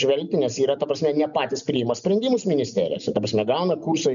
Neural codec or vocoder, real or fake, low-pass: none; real; 7.2 kHz